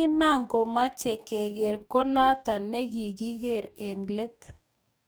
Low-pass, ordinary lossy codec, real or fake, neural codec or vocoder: none; none; fake; codec, 44.1 kHz, 2.6 kbps, DAC